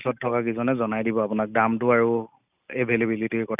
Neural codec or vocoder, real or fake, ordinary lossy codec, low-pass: none; real; none; 3.6 kHz